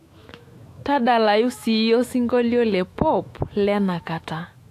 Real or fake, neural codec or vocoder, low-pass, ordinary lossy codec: fake; autoencoder, 48 kHz, 128 numbers a frame, DAC-VAE, trained on Japanese speech; 14.4 kHz; AAC, 64 kbps